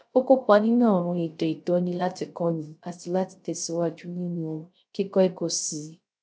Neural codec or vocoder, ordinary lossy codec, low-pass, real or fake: codec, 16 kHz, 0.3 kbps, FocalCodec; none; none; fake